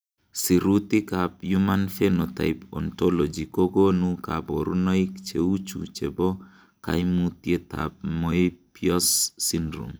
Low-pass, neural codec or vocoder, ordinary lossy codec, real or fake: none; none; none; real